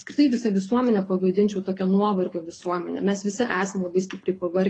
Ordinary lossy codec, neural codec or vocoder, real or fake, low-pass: AAC, 32 kbps; codec, 24 kHz, 6 kbps, HILCodec; fake; 9.9 kHz